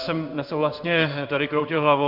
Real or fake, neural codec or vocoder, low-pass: fake; codec, 16 kHz in and 24 kHz out, 1 kbps, XY-Tokenizer; 5.4 kHz